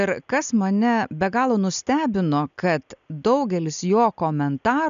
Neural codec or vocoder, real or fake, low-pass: none; real; 7.2 kHz